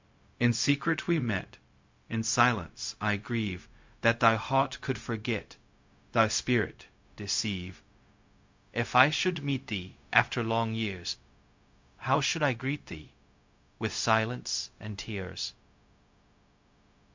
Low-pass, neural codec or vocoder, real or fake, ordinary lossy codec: 7.2 kHz; codec, 16 kHz, 0.4 kbps, LongCat-Audio-Codec; fake; MP3, 48 kbps